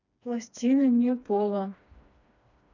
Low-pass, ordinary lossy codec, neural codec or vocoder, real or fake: 7.2 kHz; none; codec, 16 kHz, 2 kbps, FreqCodec, smaller model; fake